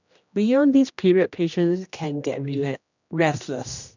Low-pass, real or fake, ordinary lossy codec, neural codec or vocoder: 7.2 kHz; fake; none; codec, 16 kHz, 1 kbps, X-Codec, HuBERT features, trained on general audio